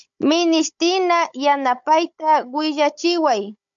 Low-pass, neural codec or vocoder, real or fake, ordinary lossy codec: 7.2 kHz; codec, 16 kHz, 16 kbps, FunCodec, trained on Chinese and English, 50 frames a second; fake; MP3, 64 kbps